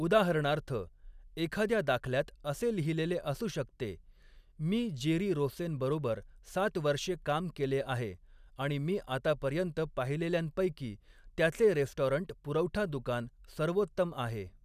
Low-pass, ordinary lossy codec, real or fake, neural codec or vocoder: 14.4 kHz; none; real; none